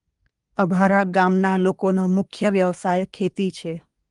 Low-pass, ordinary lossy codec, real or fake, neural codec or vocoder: 10.8 kHz; Opus, 32 kbps; fake; codec, 24 kHz, 1 kbps, SNAC